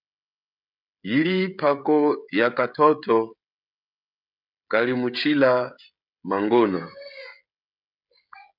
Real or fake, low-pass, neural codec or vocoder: fake; 5.4 kHz; codec, 16 kHz, 16 kbps, FreqCodec, smaller model